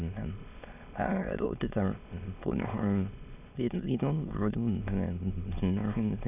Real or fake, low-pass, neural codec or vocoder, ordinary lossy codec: fake; 3.6 kHz; autoencoder, 22.05 kHz, a latent of 192 numbers a frame, VITS, trained on many speakers; none